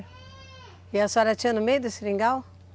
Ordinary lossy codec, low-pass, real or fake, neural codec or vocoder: none; none; real; none